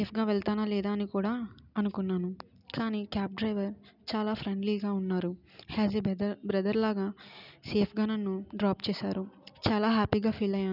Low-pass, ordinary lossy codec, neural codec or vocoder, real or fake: 5.4 kHz; none; none; real